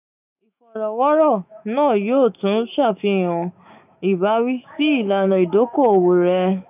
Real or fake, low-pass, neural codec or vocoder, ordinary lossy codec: fake; 3.6 kHz; autoencoder, 48 kHz, 128 numbers a frame, DAC-VAE, trained on Japanese speech; none